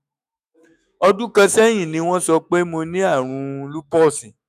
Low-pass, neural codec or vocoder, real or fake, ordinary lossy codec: 14.4 kHz; autoencoder, 48 kHz, 128 numbers a frame, DAC-VAE, trained on Japanese speech; fake; none